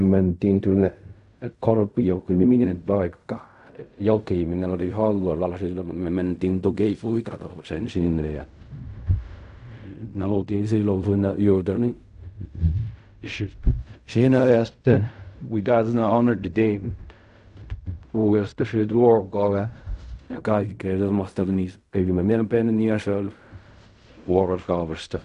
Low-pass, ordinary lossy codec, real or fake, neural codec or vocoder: 10.8 kHz; none; fake; codec, 16 kHz in and 24 kHz out, 0.4 kbps, LongCat-Audio-Codec, fine tuned four codebook decoder